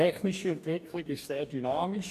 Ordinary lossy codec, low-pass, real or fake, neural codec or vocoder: none; 14.4 kHz; fake; codec, 44.1 kHz, 2.6 kbps, DAC